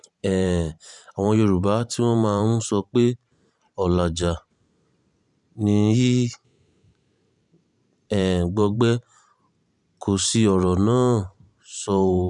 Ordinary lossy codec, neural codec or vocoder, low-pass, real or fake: none; vocoder, 44.1 kHz, 128 mel bands every 512 samples, BigVGAN v2; 10.8 kHz; fake